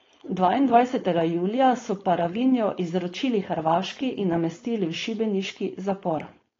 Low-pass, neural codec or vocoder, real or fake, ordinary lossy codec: 7.2 kHz; codec, 16 kHz, 4.8 kbps, FACodec; fake; AAC, 32 kbps